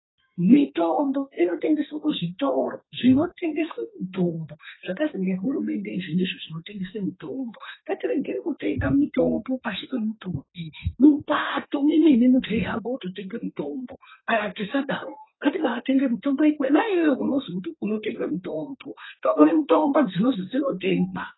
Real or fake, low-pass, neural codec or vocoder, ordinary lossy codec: fake; 7.2 kHz; codec, 32 kHz, 1.9 kbps, SNAC; AAC, 16 kbps